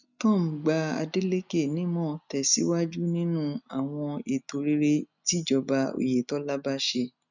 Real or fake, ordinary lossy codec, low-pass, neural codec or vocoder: real; none; 7.2 kHz; none